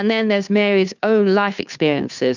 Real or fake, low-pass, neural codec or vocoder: fake; 7.2 kHz; codec, 16 kHz, 2 kbps, FunCodec, trained on Chinese and English, 25 frames a second